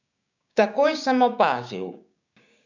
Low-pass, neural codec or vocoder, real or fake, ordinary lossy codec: 7.2 kHz; codec, 16 kHz, 6 kbps, DAC; fake; none